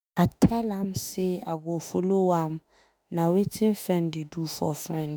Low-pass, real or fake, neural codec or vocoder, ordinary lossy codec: none; fake; autoencoder, 48 kHz, 32 numbers a frame, DAC-VAE, trained on Japanese speech; none